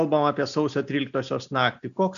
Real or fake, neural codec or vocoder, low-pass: real; none; 7.2 kHz